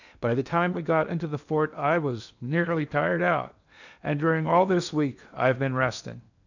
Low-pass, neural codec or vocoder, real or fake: 7.2 kHz; codec, 16 kHz in and 24 kHz out, 0.8 kbps, FocalCodec, streaming, 65536 codes; fake